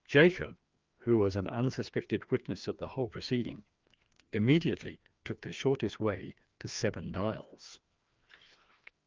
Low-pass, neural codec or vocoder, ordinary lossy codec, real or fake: 7.2 kHz; codec, 16 kHz, 2 kbps, FreqCodec, larger model; Opus, 24 kbps; fake